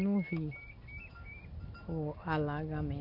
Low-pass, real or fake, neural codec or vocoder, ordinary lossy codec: 5.4 kHz; real; none; none